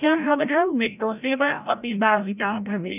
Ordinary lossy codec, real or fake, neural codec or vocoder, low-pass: none; fake; codec, 16 kHz, 0.5 kbps, FreqCodec, larger model; 3.6 kHz